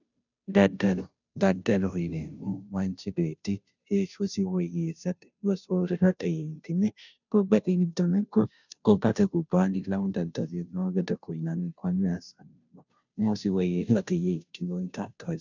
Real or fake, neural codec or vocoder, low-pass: fake; codec, 16 kHz, 0.5 kbps, FunCodec, trained on Chinese and English, 25 frames a second; 7.2 kHz